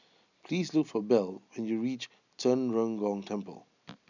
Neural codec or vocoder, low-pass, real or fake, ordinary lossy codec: none; 7.2 kHz; real; none